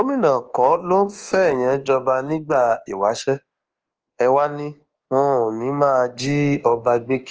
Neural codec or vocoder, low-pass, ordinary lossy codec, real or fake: codec, 24 kHz, 1.2 kbps, DualCodec; 7.2 kHz; Opus, 16 kbps; fake